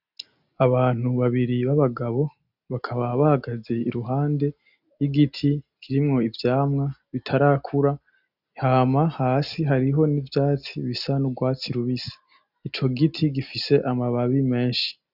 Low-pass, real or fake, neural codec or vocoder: 5.4 kHz; real; none